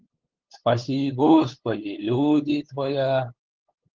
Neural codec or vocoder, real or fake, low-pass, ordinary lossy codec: codec, 16 kHz, 8 kbps, FunCodec, trained on LibriTTS, 25 frames a second; fake; 7.2 kHz; Opus, 16 kbps